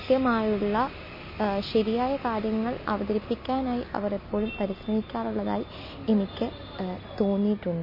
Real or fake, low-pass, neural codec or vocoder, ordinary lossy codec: real; 5.4 kHz; none; MP3, 24 kbps